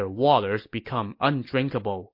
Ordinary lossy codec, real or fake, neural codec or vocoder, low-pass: MP3, 32 kbps; real; none; 5.4 kHz